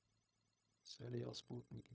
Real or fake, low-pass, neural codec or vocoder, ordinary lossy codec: fake; none; codec, 16 kHz, 0.4 kbps, LongCat-Audio-Codec; none